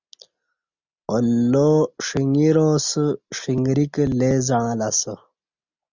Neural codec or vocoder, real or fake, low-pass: none; real; 7.2 kHz